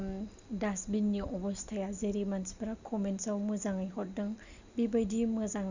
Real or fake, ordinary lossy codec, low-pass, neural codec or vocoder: real; Opus, 64 kbps; 7.2 kHz; none